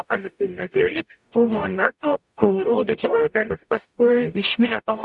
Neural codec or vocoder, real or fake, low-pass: codec, 44.1 kHz, 0.9 kbps, DAC; fake; 10.8 kHz